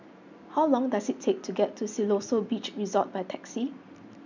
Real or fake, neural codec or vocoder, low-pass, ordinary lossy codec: real; none; 7.2 kHz; none